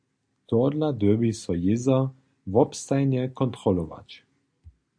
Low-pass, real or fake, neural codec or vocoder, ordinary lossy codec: 9.9 kHz; real; none; AAC, 64 kbps